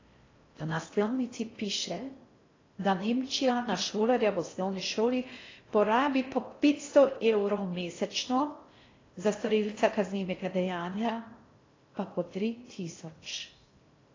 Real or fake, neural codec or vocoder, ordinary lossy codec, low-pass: fake; codec, 16 kHz in and 24 kHz out, 0.6 kbps, FocalCodec, streaming, 4096 codes; AAC, 32 kbps; 7.2 kHz